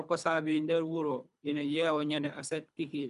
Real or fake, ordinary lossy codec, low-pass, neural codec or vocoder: fake; MP3, 96 kbps; 10.8 kHz; codec, 24 kHz, 3 kbps, HILCodec